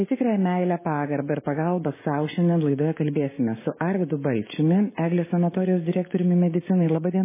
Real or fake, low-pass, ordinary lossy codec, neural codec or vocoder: fake; 3.6 kHz; MP3, 16 kbps; codec, 16 kHz, 6 kbps, DAC